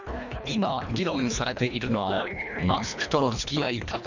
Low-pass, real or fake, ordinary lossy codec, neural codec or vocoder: 7.2 kHz; fake; none; codec, 24 kHz, 1.5 kbps, HILCodec